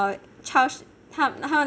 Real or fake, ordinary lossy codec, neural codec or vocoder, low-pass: real; none; none; none